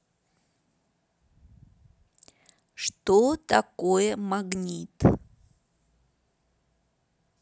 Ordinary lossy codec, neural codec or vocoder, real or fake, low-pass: none; none; real; none